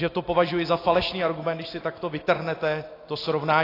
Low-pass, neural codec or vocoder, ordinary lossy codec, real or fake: 5.4 kHz; none; AAC, 24 kbps; real